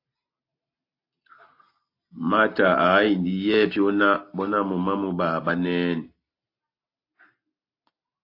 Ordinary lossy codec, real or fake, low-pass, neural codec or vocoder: AAC, 32 kbps; real; 5.4 kHz; none